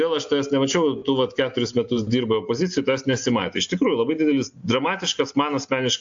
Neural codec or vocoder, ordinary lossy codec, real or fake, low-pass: none; AAC, 64 kbps; real; 7.2 kHz